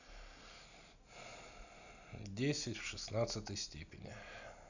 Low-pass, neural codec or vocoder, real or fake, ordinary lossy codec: 7.2 kHz; none; real; none